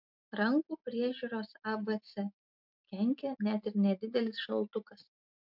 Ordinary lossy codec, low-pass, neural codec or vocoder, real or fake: MP3, 48 kbps; 5.4 kHz; none; real